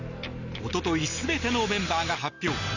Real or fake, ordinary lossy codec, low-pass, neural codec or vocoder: real; none; 7.2 kHz; none